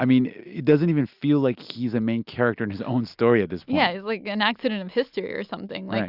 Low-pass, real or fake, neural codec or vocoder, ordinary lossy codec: 5.4 kHz; real; none; Opus, 64 kbps